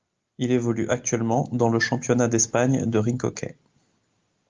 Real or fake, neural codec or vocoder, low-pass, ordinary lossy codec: real; none; 7.2 kHz; Opus, 32 kbps